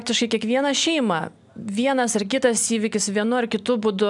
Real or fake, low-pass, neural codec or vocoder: real; 10.8 kHz; none